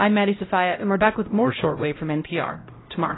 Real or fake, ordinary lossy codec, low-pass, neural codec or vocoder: fake; AAC, 16 kbps; 7.2 kHz; codec, 16 kHz, 0.5 kbps, X-Codec, HuBERT features, trained on LibriSpeech